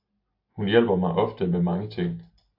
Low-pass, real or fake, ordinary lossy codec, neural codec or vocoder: 5.4 kHz; real; AAC, 48 kbps; none